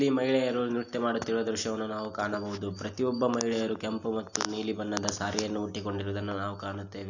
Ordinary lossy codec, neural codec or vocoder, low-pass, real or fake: none; none; 7.2 kHz; real